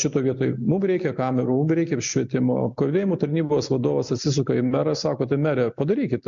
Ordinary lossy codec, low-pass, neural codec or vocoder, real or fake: MP3, 64 kbps; 7.2 kHz; none; real